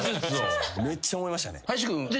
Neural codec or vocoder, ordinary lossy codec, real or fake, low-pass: none; none; real; none